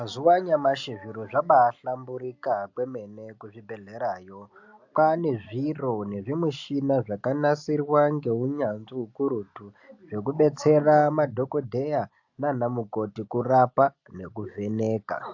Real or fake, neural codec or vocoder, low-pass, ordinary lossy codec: real; none; 7.2 kHz; AAC, 48 kbps